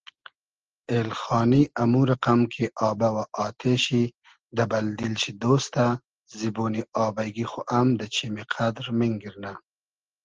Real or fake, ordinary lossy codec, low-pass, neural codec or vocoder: real; Opus, 16 kbps; 7.2 kHz; none